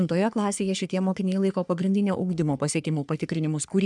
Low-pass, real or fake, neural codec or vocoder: 10.8 kHz; fake; codec, 44.1 kHz, 3.4 kbps, Pupu-Codec